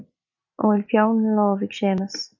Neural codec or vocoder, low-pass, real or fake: none; 7.2 kHz; real